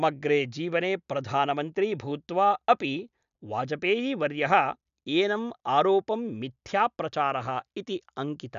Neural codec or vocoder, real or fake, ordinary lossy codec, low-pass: none; real; none; 7.2 kHz